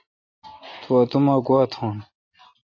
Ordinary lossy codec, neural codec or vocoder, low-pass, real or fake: AAC, 32 kbps; none; 7.2 kHz; real